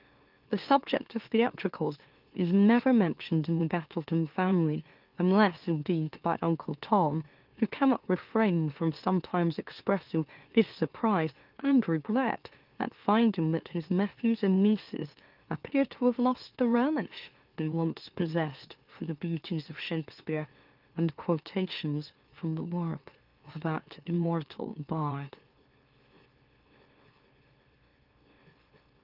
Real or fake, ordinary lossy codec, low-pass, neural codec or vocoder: fake; Opus, 32 kbps; 5.4 kHz; autoencoder, 44.1 kHz, a latent of 192 numbers a frame, MeloTTS